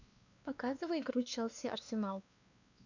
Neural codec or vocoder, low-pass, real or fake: codec, 16 kHz, 2 kbps, X-Codec, WavLM features, trained on Multilingual LibriSpeech; 7.2 kHz; fake